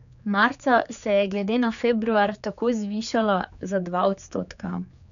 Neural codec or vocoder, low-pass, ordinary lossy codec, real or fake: codec, 16 kHz, 4 kbps, X-Codec, HuBERT features, trained on general audio; 7.2 kHz; none; fake